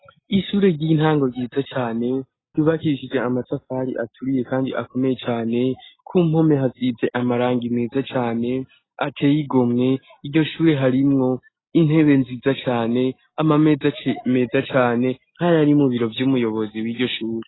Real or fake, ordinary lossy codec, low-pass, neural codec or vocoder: real; AAC, 16 kbps; 7.2 kHz; none